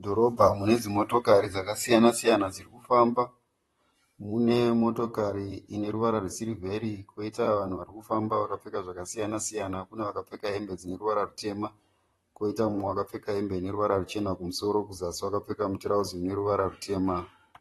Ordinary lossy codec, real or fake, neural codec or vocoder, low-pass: AAC, 32 kbps; fake; vocoder, 44.1 kHz, 128 mel bands, Pupu-Vocoder; 19.8 kHz